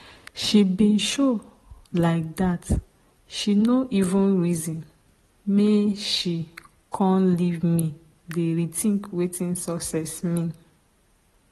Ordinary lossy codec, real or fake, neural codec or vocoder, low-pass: AAC, 32 kbps; real; none; 19.8 kHz